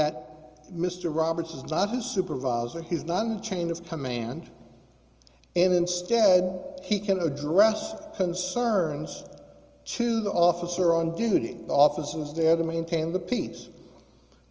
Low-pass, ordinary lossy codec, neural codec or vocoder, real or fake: 7.2 kHz; Opus, 24 kbps; none; real